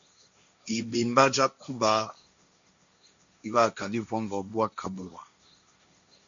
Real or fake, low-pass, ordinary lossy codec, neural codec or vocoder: fake; 7.2 kHz; MP3, 64 kbps; codec, 16 kHz, 1.1 kbps, Voila-Tokenizer